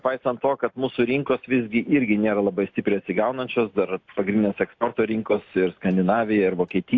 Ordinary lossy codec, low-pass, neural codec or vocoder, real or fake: AAC, 48 kbps; 7.2 kHz; none; real